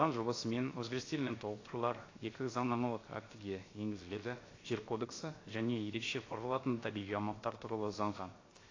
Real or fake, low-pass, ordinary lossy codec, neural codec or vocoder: fake; 7.2 kHz; AAC, 32 kbps; codec, 16 kHz, 0.7 kbps, FocalCodec